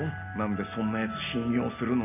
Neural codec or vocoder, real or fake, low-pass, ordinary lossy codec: none; real; 3.6 kHz; AAC, 32 kbps